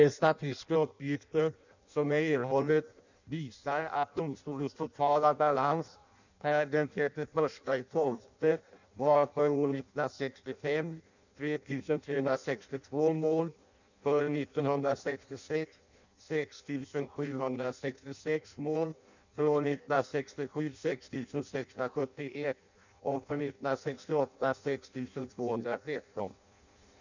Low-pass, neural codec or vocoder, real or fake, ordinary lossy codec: 7.2 kHz; codec, 16 kHz in and 24 kHz out, 0.6 kbps, FireRedTTS-2 codec; fake; none